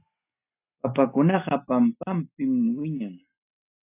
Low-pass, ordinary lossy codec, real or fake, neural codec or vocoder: 3.6 kHz; AAC, 24 kbps; real; none